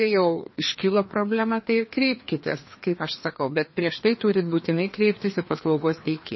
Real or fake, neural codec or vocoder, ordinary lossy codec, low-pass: fake; codec, 16 kHz, 2 kbps, FreqCodec, larger model; MP3, 24 kbps; 7.2 kHz